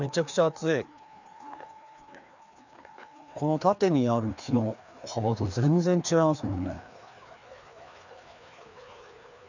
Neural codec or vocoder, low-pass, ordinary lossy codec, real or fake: codec, 16 kHz, 2 kbps, FreqCodec, larger model; 7.2 kHz; none; fake